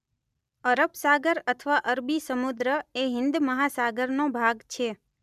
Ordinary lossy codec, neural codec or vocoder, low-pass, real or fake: none; none; 14.4 kHz; real